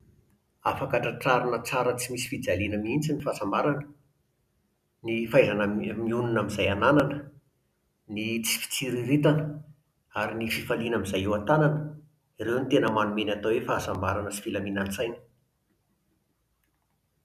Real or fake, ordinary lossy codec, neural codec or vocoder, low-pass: real; none; none; 14.4 kHz